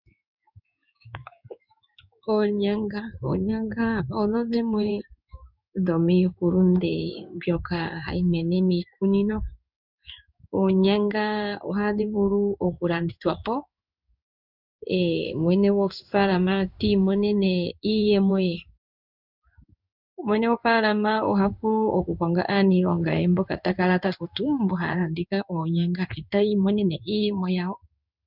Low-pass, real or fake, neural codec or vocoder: 5.4 kHz; fake; codec, 16 kHz in and 24 kHz out, 1 kbps, XY-Tokenizer